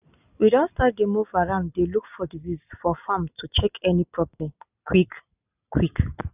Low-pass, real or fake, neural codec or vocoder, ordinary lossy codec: 3.6 kHz; real; none; none